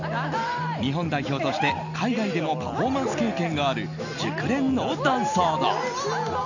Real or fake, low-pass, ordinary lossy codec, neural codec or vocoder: real; 7.2 kHz; none; none